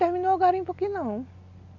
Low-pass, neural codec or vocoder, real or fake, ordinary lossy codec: 7.2 kHz; none; real; none